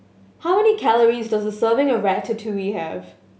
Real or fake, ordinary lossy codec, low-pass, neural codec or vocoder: real; none; none; none